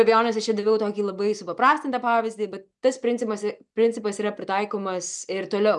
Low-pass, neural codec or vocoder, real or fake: 10.8 kHz; none; real